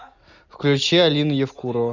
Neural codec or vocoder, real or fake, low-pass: none; real; 7.2 kHz